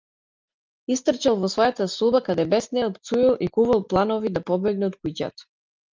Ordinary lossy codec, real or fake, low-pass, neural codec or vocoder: Opus, 24 kbps; real; 7.2 kHz; none